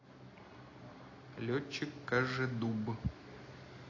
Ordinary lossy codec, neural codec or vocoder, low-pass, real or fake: AAC, 32 kbps; none; 7.2 kHz; real